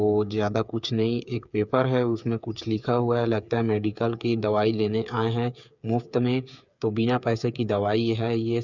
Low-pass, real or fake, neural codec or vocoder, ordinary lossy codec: 7.2 kHz; fake; codec, 16 kHz, 8 kbps, FreqCodec, smaller model; none